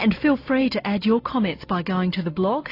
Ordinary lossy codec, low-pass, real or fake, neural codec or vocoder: AAC, 32 kbps; 5.4 kHz; real; none